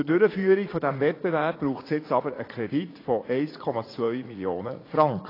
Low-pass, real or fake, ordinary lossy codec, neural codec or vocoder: 5.4 kHz; fake; AAC, 24 kbps; vocoder, 44.1 kHz, 80 mel bands, Vocos